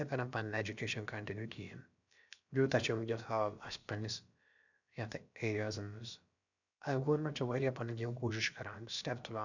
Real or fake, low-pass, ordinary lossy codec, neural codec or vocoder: fake; 7.2 kHz; none; codec, 16 kHz, about 1 kbps, DyCAST, with the encoder's durations